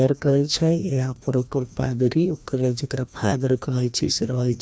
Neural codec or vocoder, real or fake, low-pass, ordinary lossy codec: codec, 16 kHz, 1 kbps, FreqCodec, larger model; fake; none; none